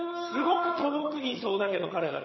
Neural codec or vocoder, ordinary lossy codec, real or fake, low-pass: vocoder, 22.05 kHz, 80 mel bands, HiFi-GAN; MP3, 24 kbps; fake; 7.2 kHz